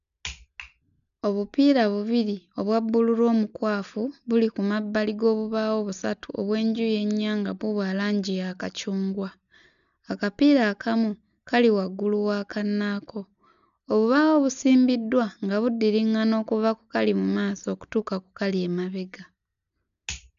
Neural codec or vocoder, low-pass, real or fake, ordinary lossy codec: none; 7.2 kHz; real; none